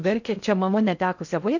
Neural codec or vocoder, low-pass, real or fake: codec, 16 kHz in and 24 kHz out, 0.6 kbps, FocalCodec, streaming, 2048 codes; 7.2 kHz; fake